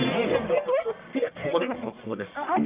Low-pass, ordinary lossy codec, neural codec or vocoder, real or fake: 3.6 kHz; Opus, 32 kbps; codec, 44.1 kHz, 1.7 kbps, Pupu-Codec; fake